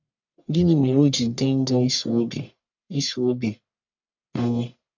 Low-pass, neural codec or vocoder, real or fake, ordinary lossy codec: 7.2 kHz; codec, 44.1 kHz, 1.7 kbps, Pupu-Codec; fake; none